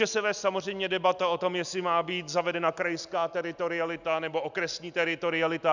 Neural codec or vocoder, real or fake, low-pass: none; real; 7.2 kHz